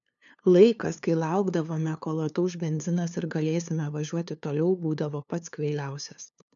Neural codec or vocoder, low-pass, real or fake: codec, 16 kHz, 2 kbps, FunCodec, trained on LibriTTS, 25 frames a second; 7.2 kHz; fake